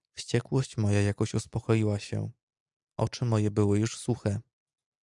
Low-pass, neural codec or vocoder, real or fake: 10.8 kHz; none; real